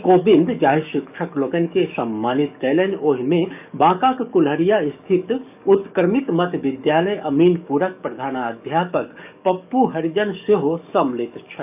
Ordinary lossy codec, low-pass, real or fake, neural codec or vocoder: none; 3.6 kHz; fake; codec, 44.1 kHz, 7.8 kbps, DAC